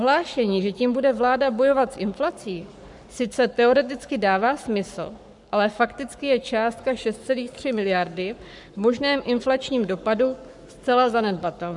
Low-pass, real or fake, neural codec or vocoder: 10.8 kHz; fake; codec, 44.1 kHz, 7.8 kbps, Pupu-Codec